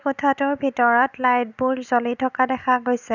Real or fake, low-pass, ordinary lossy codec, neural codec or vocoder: real; 7.2 kHz; none; none